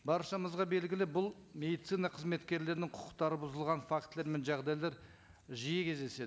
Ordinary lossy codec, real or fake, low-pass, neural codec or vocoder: none; real; none; none